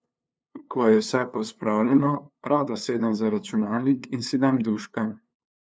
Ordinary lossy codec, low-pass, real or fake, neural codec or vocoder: none; none; fake; codec, 16 kHz, 2 kbps, FunCodec, trained on LibriTTS, 25 frames a second